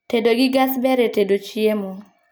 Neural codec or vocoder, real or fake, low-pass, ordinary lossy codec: none; real; none; none